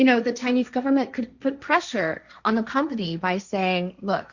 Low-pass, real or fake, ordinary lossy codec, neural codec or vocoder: 7.2 kHz; fake; Opus, 64 kbps; codec, 16 kHz, 1.1 kbps, Voila-Tokenizer